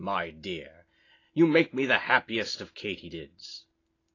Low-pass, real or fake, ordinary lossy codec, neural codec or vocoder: 7.2 kHz; real; AAC, 32 kbps; none